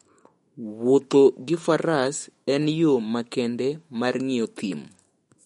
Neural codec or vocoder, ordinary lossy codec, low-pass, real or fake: autoencoder, 48 kHz, 128 numbers a frame, DAC-VAE, trained on Japanese speech; MP3, 48 kbps; 19.8 kHz; fake